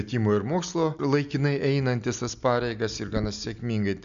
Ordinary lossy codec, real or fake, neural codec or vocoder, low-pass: AAC, 96 kbps; real; none; 7.2 kHz